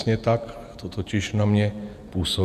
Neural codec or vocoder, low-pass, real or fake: vocoder, 48 kHz, 128 mel bands, Vocos; 14.4 kHz; fake